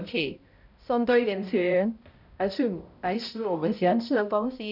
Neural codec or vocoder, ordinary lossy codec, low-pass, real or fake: codec, 16 kHz, 0.5 kbps, X-Codec, HuBERT features, trained on balanced general audio; none; 5.4 kHz; fake